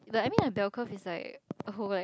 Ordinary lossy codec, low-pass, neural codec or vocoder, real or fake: none; none; none; real